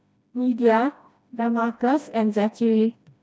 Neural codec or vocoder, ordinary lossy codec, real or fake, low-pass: codec, 16 kHz, 1 kbps, FreqCodec, smaller model; none; fake; none